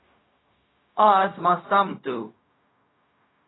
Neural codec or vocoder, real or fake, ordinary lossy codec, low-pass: codec, 16 kHz, 0.4 kbps, LongCat-Audio-Codec; fake; AAC, 16 kbps; 7.2 kHz